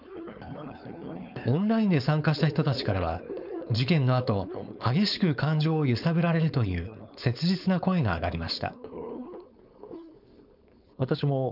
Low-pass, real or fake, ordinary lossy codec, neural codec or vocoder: 5.4 kHz; fake; none; codec, 16 kHz, 4.8 kbps, FACodec